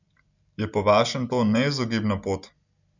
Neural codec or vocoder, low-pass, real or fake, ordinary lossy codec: none; 7.2 kHz; real; none